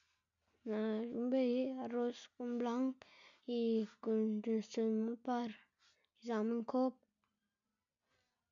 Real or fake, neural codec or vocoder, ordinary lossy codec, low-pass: real; none; none; 7.2 kHz